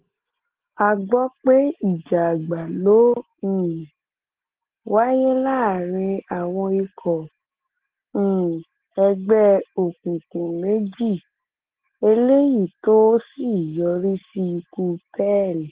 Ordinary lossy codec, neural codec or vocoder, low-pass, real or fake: Opus, 16 kbps; none; 3.6 kHz; real